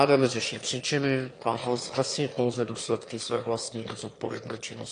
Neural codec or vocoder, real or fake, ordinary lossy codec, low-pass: autoencoder, 22.05 kHz, a latent of 192 numbers a frame, VITS, trained on one speaker; fake; AAC, 48 kbps; 9.9 kHz